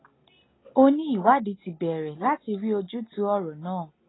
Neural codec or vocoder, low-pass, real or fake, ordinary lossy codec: none; 7.2 kHz; real; AAC, 16 kbps